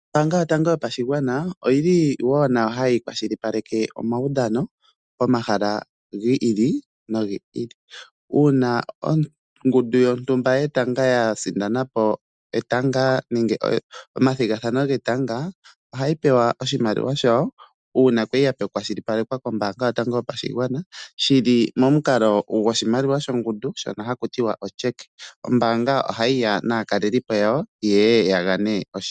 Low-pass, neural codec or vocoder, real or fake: 9.9 kHz; none; real